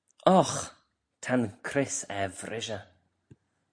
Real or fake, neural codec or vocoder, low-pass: real; none; 9.9 kHz